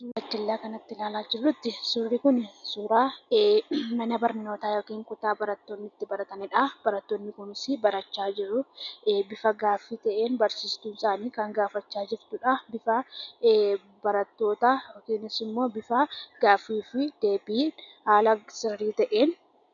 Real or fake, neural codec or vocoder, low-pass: real; none; 7.2 kHz